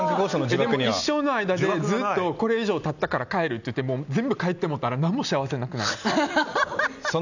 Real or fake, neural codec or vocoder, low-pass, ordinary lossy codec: real; none; 7.2 kHz; none